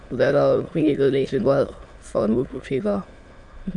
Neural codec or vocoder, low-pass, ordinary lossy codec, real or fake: autoencoder, 22.05 kHz, a latent of 192 numbers a frame, VITS, trained on many speakers; 9.9 kHz; AAC, 64 kbps; fake